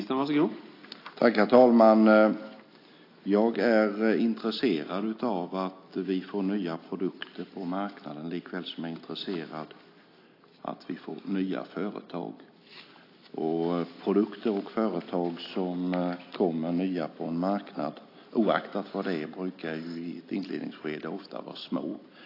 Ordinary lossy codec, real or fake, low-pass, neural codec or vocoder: AAC, 32 kbps; real; 5.4 kHz; none